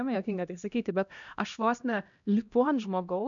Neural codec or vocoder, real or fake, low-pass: codec, 16 kHz, 1 kbps, X-Codec, HuBERT features, trained on LibriSpeech; fake; 7.2 kHz